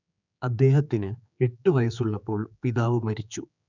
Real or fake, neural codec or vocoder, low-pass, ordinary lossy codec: fake; codec, 16 kHz, 4 kbps, X-Codec, HuBERT features, trained on general audio; 7.2 kHz; none